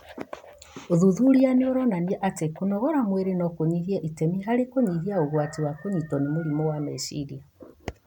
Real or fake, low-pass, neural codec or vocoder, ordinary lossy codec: real; 19.8 kHz; none; none